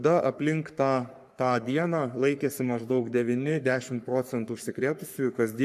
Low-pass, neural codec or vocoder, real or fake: 14.4 kHz; codec, 44.1 kHz, 3.4 kbps, Pupu-Codec; fake